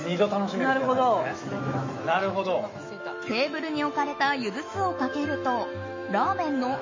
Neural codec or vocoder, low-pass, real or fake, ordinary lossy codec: autoencoder, 48 kHz, 128 numbers a frame, DAC-VAE, trained on Japanese speech; 7.2 kHz; fake; MP3, 32 kbps